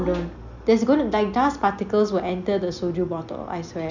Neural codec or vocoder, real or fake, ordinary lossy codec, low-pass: none; real; none; 7.2 kHz